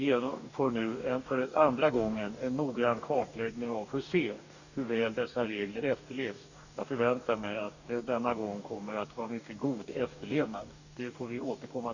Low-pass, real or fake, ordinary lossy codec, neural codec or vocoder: 7.2 kHz; fake; none; codec, 44.1 kHz, 2.6 kbps, DAC